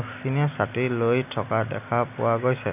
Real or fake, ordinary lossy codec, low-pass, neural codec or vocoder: real; none; 3.6 kHz; none